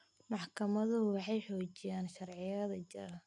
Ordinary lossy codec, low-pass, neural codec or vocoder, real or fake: none; 10.8 kHz; none; real